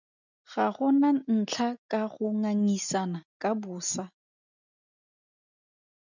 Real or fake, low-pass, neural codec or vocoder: real; 7.2 kHz; none